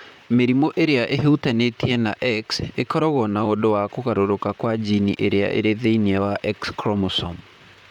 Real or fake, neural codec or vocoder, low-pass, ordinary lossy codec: fake; vocoder, 44.1 kHz, 128 mel bands, Pupu-Vocoder; 19.8 kHz; none